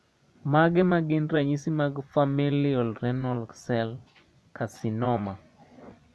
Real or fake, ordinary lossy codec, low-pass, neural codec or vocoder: fake; none; none; vocoder, 24 kHz, 100 mel bands, Vocos